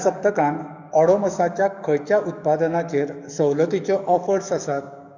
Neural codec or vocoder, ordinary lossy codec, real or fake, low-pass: codec, 44.1 kHz, 7.8 kbps, DAC; none; fake; 7.2 kHz